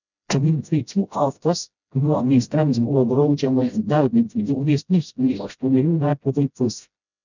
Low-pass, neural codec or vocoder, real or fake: 7.2 kHz; codec, 16 kHz, 0.5 kbps, FreqCodec, smaller model; fake